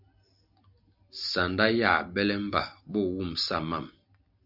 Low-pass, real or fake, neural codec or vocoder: 5.4 kHz; real; none